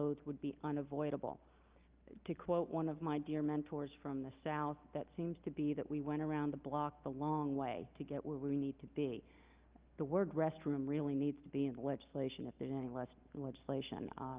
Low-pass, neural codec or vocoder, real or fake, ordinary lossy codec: 3.6 kHz; none; real; Opus, 32 kbps